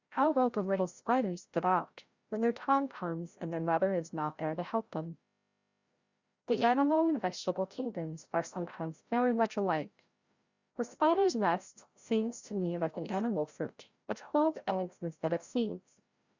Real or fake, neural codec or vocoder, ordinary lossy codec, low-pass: fake; codec, 16 kHz, 0.5 kbps, FreqCodec, larger model; Opus, 64 kbps; 7.2 kHz